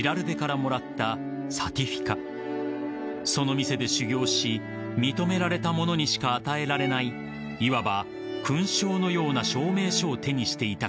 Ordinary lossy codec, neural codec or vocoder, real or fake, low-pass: none; none; real; none